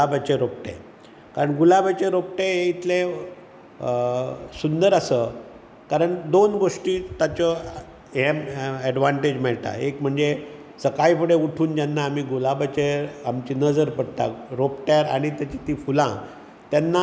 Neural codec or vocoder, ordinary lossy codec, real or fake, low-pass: none; none; real; none